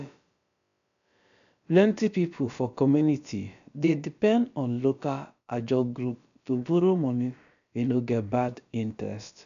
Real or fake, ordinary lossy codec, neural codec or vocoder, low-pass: fake; none; codec, 16 kHz, about 1 kbps, DyCAST, with the encoder's durations; 7.2 kHz